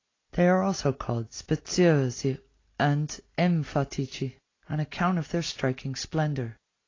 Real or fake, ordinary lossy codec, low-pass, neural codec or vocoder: real; AAC, 32 kbps; 7.2 kHz; none